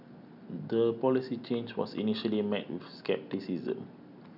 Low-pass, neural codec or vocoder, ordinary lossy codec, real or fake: 5.4 kHz; none; none; real